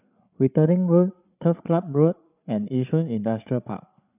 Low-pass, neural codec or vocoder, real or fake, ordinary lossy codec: 3.6 kHz; codec, 16 kHz, 8 kbps, FreqCodec, larger model; fake; none